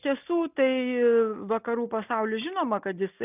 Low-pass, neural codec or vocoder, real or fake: 3.6 kHz; none; real